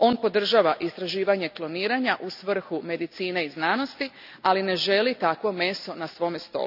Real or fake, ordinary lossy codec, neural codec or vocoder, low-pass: real; none; none; 5.4 kHz